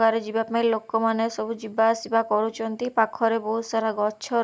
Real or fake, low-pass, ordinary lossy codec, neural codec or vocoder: real; none; none; none